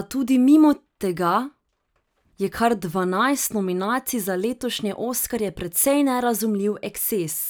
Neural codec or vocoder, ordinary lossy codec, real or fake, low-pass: none; none; real; none